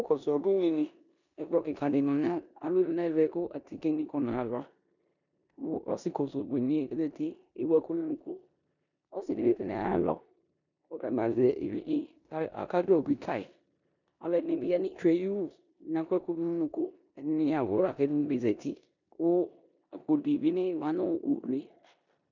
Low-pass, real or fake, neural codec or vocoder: 7.2 kHz; fake; codec, 16 kHz in and 24 kHz out, 0.9 kbps, LongCat-Audio-Codec, four codebook decoder